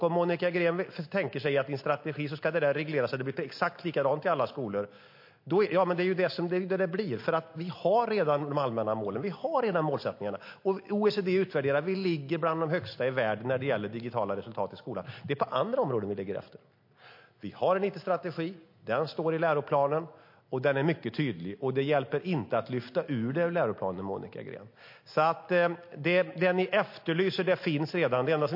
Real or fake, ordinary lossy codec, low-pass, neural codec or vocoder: real; MP3, 32 kbps; 5.4 kHz; none